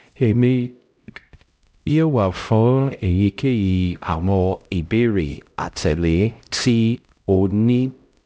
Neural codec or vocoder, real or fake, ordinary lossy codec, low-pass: codec, 16 kHz, 0.5 kbps, X-Codec, HuBERT features, trained on LibriSpeech; fake; none; none